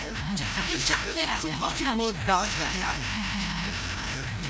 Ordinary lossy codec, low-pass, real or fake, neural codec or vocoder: none; none; fake; codec, 16 kHz, 0.5 kbps, FreqCodec, larger model